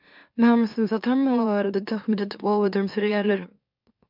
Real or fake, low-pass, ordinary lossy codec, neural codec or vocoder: fake; 5.4 kHz; MP3, 48 kbps; autoencoder, 44.1 kHz, a latent of 192 numbers a frame, MeloTTS